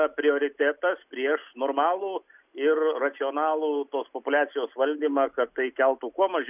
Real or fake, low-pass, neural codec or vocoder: real; 3.6 kHz; none